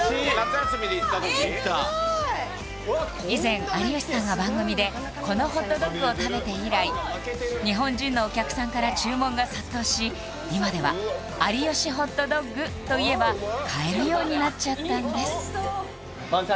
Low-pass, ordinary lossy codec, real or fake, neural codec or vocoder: none; none; real; none